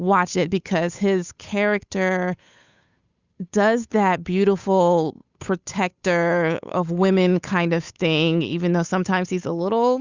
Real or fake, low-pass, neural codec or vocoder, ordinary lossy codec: fake; 7.2 kHz; codec, 16 kHz, 8 kbps, FunCodec, trained on Chinese and English, 25 frames a second; Opus, 64 kbps